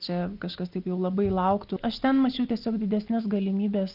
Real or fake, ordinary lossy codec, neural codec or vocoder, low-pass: real; Opus, 32 kbps; none; 5.4 kHz